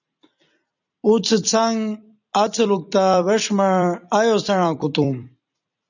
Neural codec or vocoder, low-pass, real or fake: vocoder, 44.1 kHz, 128 mel bands every 256 samples, BigVGAN v2; 7.2 kHz; fake